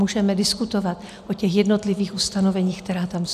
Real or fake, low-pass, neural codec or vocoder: real; 14.4 kHz; none